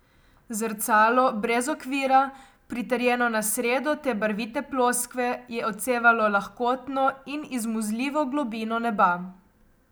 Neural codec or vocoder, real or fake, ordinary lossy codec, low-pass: none; real; none; none